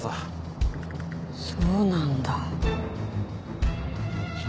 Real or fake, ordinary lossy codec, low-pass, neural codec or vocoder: real; none; none; none